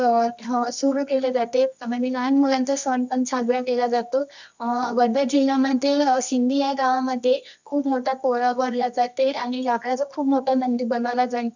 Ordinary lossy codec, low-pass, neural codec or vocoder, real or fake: none; 7.2 kHz; codec, 24 kHz, 0.9 kbps, WavTokenizer, medium music audio release; fake